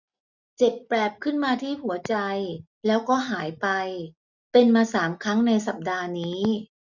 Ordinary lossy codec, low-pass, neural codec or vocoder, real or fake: none; 7.2 kHz; none; real